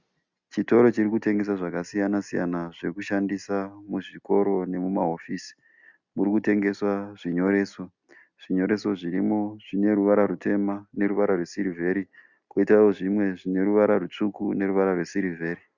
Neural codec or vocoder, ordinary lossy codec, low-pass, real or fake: none; Opus, 64 kbps; 7.2 kHz; real